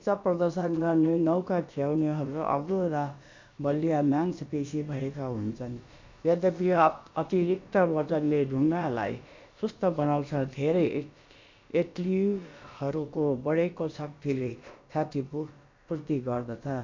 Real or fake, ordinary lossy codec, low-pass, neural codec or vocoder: fake; MP3, 64 kbps; 7.2 kHz; codec, 16 kHz, about 1 kbps, DyCAST, with the encoder's durations